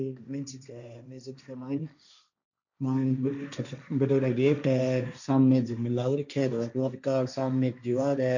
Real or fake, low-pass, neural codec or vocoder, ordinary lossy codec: fake; 7.2 kHz; codec, 16 kHz, 1.1 kbps, Voila-Tokenizer; none